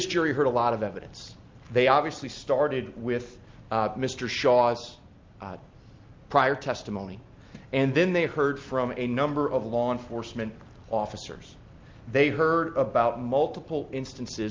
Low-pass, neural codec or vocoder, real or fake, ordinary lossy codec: 7.2 kHz; none; real; Opus, 32 kbps